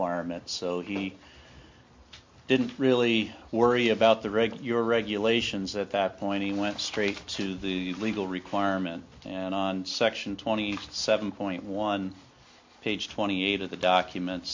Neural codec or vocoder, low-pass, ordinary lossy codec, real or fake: none; 7.2 kHz; MP3, 48 kbps; real